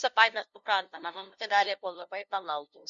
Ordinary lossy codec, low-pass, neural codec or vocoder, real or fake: Opus, 64 kbps; 7.2 kHz; codec, 16 kHz, 0.5 kbps, FunCodec, trained on LibriTTS, 25 frames a second; fake